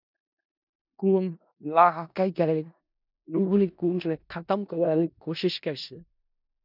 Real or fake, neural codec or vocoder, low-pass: fake; codec, 16 kHz in and 24 kHz out, 0.4 kbps, LongCat-Audio-Codec, four codebook decoder; 5.4 kHz